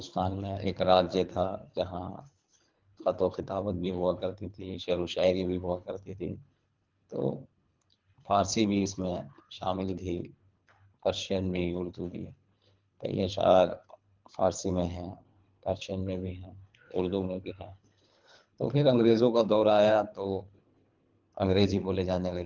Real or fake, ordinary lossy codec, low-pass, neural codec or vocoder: fake; Opus, 32 kbps; 7.2 kHz; codec, 24 kHz, 3 kbps, HILCodec